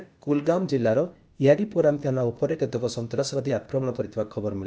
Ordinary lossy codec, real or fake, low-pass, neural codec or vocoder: none; fake; none; codec, 16 kHz, 0.8 kbps, ZipCodec